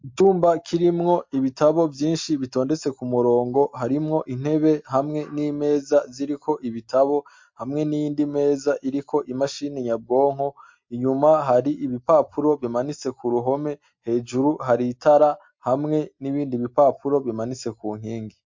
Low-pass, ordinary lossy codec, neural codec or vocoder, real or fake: 7.2 kHz; MP3, 48 kbps; none; real